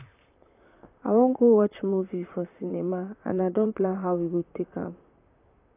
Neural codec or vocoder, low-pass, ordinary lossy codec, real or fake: vocoder, 44.1 kHz, 128 mel bands, Pupu-Vocoder; 3.6 kHz; AAC, 24 kbps; fake